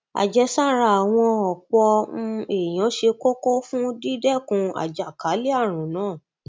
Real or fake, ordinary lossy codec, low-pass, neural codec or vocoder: real; none; none; none